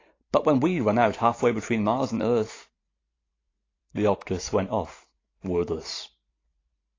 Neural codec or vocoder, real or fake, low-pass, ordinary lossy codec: vocoder, 44.1 kHz, 128 mel bands every 512 samples, BigVGAN v2; fake; 7.2 kHz; AAC, 32 kbps